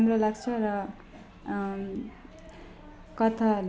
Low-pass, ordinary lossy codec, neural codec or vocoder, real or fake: none; none; none; real